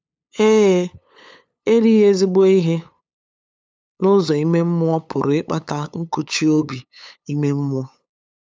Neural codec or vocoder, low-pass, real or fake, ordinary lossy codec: codec, 16 kHz, 8 kbps, FunCodec, trained on LibriTTS, 25 frames a second; none; fake; none